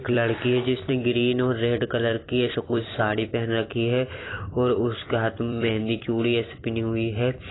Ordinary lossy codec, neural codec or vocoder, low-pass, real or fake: AAC, 16 kbps; vocoder, 44.1 kHz, 128 mel bands every 256 samples, BigVGAN v2; 7.2 kHz; fake